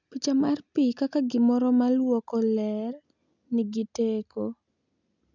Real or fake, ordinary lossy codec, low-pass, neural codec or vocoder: real; none; 7.2 kHz; none